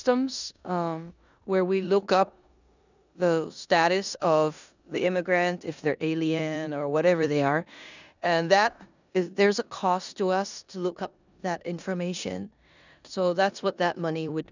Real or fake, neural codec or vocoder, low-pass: fake; codec, 16 kHz in and 24 kHz out, 0.9 kbps, LongCat-Audio-Codec, four codebook decoder; 7.2 kHz